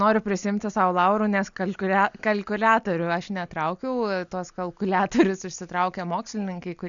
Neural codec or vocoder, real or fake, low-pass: none; real; 7.2 kHz